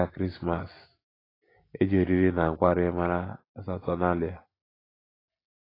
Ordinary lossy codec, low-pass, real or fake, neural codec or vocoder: AAC, 24 kbps; 5.4 kHz; real; none